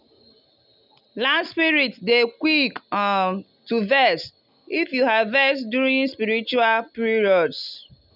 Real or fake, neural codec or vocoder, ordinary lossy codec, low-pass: real; none; none; 5.4 kHz